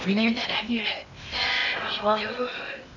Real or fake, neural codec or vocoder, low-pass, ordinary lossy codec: fake; codec, 16 kHz in and 24 kHz out, 0.8 kbps, FocalCodec, streaming, 65536 codes; 7.2 kHz; none